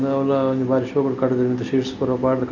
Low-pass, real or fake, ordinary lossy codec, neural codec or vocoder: 7.2 kHz; real; none; none